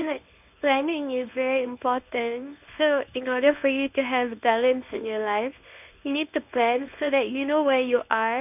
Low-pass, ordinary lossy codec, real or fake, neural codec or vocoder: 3.6 kHz; none; fake; codec, 24 kHz, 0.9 kbps, WavTokenizer, medium speech release version 1